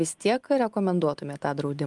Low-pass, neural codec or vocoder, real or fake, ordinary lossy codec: 10.8 kHz; none; real; Opus, 32 kbps